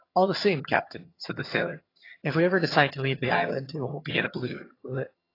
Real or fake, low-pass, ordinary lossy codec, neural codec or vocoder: fake; 5.4 kHz; AAC, 24 kbps; vocoder, 22.05 kHz, 80 mel bands, HiFi-GAN